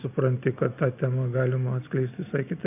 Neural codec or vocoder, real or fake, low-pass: none; real; 3.6 kHz